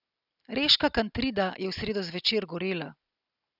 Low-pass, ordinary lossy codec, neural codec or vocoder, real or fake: 5.4 kHz; none; none; real